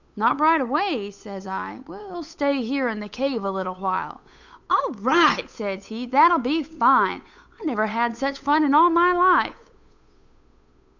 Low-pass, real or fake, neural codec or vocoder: 7.2 kHz; fake; codec, 16 kHz, 8 kbps, FunCodec, trained on Chinese and English, 25 frames a second